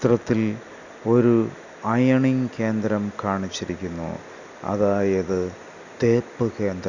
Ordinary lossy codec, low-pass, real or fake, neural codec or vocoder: none; 7.2 kHz; real; none